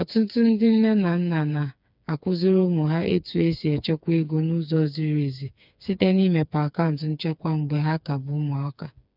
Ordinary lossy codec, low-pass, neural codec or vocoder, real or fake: none; 5.4 kHz; codec, 16 kHz, 4 kbps, FreqCodec, smaller model; fake